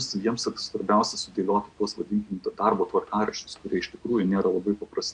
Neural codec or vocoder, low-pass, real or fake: none; 9.9 kHz; real